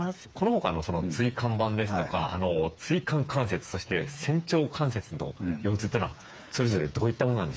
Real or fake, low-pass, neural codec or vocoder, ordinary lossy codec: fake; none; codec, 16 kHz, 4 kbps, FreqCodec, smaller model; none